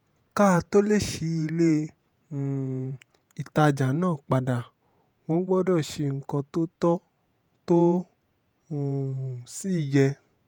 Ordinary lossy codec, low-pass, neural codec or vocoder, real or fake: none; none; vocoder, 48 kHz, 128 mel bands, Vocos; fake